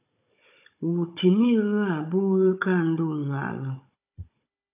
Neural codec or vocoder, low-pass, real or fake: codec, 16 kHz, 16 kbps, FunCodec, trained on Chinese and English, 50 frames a second; 3.6 kHz; fake